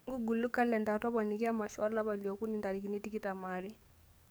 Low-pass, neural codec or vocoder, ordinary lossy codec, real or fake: none; codec, 44.1 kHz, 7.8 kbps, DAC; none; fake